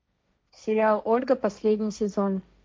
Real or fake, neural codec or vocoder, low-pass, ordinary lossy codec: fake; codec, 16 kHz, 1.1 kbps, Voila-Tokenizer; none; none